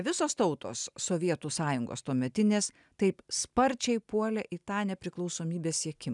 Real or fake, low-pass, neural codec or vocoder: real; 10.8 kHz; none